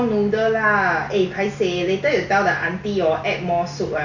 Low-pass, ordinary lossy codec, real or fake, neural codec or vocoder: 7.2 kHz; none; real; none